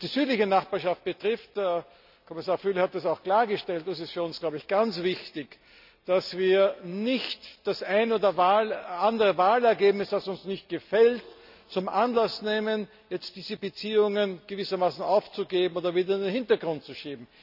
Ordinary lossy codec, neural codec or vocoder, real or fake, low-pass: none; none; real; 5.4 kHz